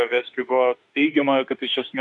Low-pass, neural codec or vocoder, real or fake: 10.8 kHz; autoencoder, 48 kHz, 32 numbers a frame, DAC-VAE, trained on Japanese speech; fake